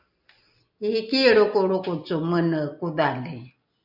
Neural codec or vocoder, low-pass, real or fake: none; 5.4 kHz; real